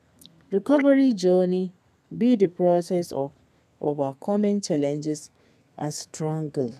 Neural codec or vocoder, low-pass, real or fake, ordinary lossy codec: codec, 32 kHz, 1.9 kbps, SNAC; 14.4 kHz; fake; none